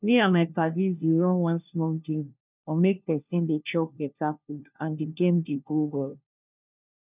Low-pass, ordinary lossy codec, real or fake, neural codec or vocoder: 3.6 kHz; none; fake; codec, 16 kHz, 1 kbps, FunCodec, trained on LibriTTS, 50 frames a second